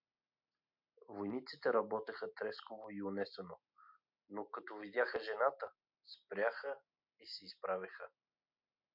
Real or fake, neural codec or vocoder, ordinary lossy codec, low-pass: real; none; MP3, 48 kbps; 5.4 kHz